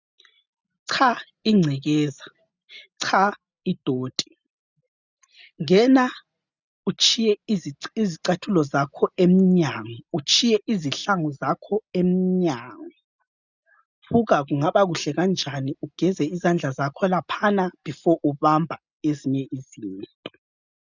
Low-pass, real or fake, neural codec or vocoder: 7.2 kHz; real; none